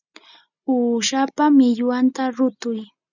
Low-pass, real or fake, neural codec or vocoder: 7.2 kHz; real; none